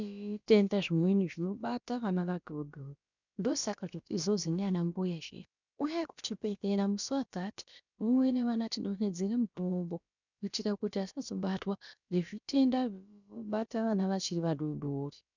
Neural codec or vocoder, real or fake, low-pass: codec, 16 kHz, about 1 kbps, DyCAST, with the encoder's durations; fake; 7.2 kHz